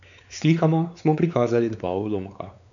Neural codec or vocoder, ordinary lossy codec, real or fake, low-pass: codec, 16 kHz, 4 kbps, X-Codec, WavLM features, trained on Multilingual LibriSpeech; none; fake; 7.2 kHz